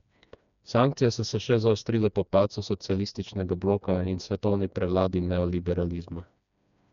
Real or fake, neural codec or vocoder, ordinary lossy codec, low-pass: fake; codec, 16 kHz, 2 kbps, FreqCodec, smaller model; none; 7.2 kHz